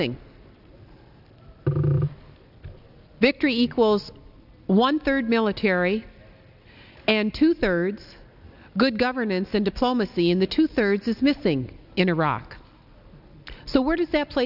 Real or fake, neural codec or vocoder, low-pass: real; none; 5.4 kHz